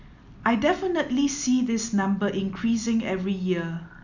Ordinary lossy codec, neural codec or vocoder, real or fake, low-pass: none; none; real; 7.2 kHz